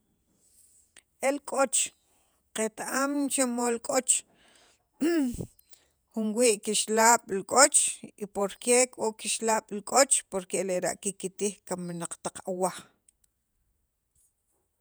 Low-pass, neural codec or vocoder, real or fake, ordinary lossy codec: none; vocoder, 48 kHz, 128 mel bands, Vocos; fake; none